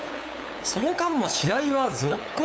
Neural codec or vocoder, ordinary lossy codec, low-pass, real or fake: codec, 16 kHz, 8 kbps, FunCodec, trained on LibriTTS, 25 frames a second; none; none; fake